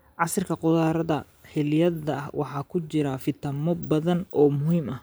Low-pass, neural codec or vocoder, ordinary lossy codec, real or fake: none; vocoder, 44.1 kHz, 128 mel bands every 512 samples, BigVGAN v2; none; fake